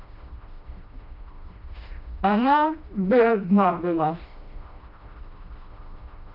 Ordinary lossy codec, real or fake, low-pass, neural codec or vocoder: none; fake; 5.4 kHz; codec, 16 kHz, 1 kbps, FreqCodec, smaller model